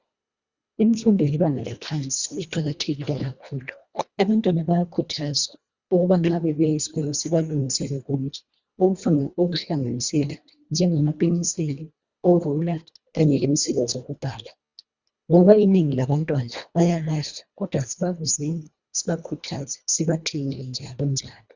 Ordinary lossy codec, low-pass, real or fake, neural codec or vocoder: Opus, 64 kbps; 7.2 kHz; fake; codec, 24 kHz, 1.5 kbps, HILCodec